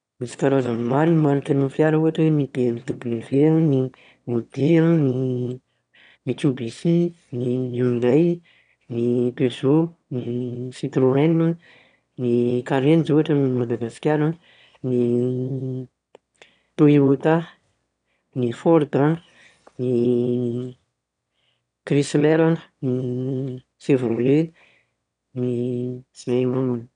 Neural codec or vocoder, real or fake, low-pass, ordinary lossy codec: autoencoder, 22.05 kHz, a latent of 192 numbers a frame, VITS, trained on one speaker; fake; 9.9 kHz; none